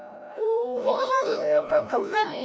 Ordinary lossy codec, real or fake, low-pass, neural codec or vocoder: none; fake; none; codec, 16 kHz, 0.5 kbps, FreqCodec, larger model